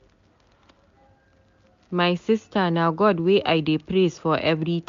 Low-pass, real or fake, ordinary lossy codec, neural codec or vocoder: 7.2 kHz; real; MP3, 64 kbps; none